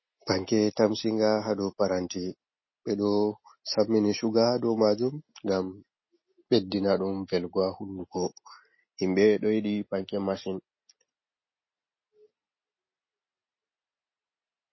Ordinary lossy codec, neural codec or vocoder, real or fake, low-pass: MP3, 24 kbps; none; real; 7.2 kHz